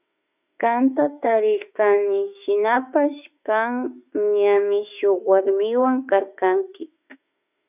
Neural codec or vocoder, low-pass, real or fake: autoencoder, 48 kHz, 32 numbers a frame, DAC-VAE, trained on Japanese speech; 3.6 kHz; fake